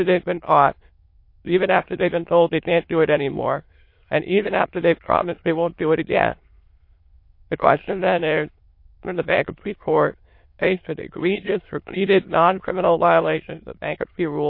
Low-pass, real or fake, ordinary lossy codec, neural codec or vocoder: 5.4 kHz; fake; MP3, 32 kbps; autoencoder, 22.05 kHz, a latent of 192 numbers a frame, VITS, trained on many speakers